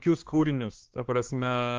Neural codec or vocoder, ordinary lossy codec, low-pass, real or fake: codec, 16 kHz, 2 kbps, X-Codec, HuBERT features, trained on balanced general audio; Opus, 16 kbps; 7.2 kHz; fake